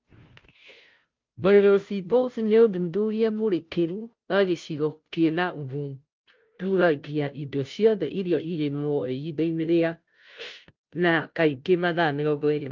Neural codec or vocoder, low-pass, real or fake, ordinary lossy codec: codec, 16 kHz, 0.5 kbps, FunCodec, trained on Chinese and English, 25 frames a second; 7.2 kHz; fake; Opus, 24 kbps